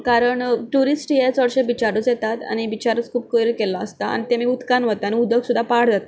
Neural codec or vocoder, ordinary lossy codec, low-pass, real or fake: none; none; none; real